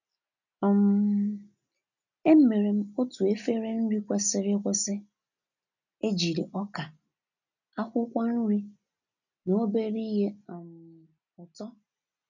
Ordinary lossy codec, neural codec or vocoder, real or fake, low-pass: none; none; real; 7.2 kHz